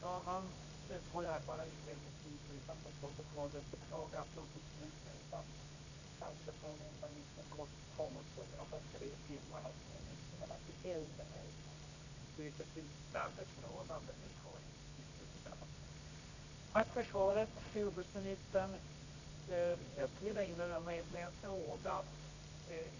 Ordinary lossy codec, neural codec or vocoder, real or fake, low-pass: none; codec, 24 kHz, 0.9 kbps, WavTokenizer, medium music audio release; fake; 7.2 kHz